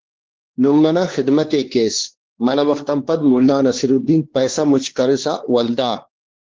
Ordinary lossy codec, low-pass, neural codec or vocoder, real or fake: Opus, 16 kbps; 7.2 kHz; codec, 16 kHz, 2 kbps, X-Codec, WavLM features, trained on Multilingual LibriSpeech; fake